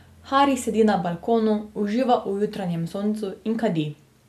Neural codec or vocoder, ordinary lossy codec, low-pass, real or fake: none; none; 14.4 kHz; real